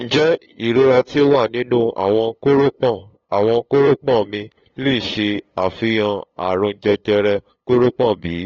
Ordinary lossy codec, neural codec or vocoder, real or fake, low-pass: AAC, 24 kbps; codec, 16 kHz, 8 kbps, FunCodec, trained on LibriTTS, 25 frames a second; fake; 7.2 kHz